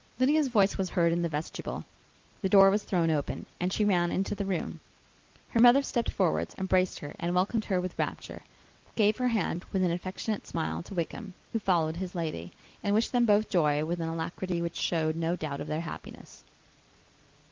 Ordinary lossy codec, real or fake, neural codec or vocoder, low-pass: Opus, 32 kbps; real; none; 7.2 kHz